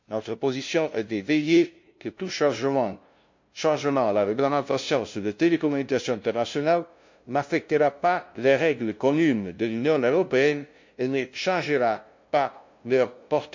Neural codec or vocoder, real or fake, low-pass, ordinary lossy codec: codec, 16 kHz, 0.5 kbps, FunCodec, trained on LibriTTS, 25 frames a second; fake; 7.2 kHz; MP3, 48 kbps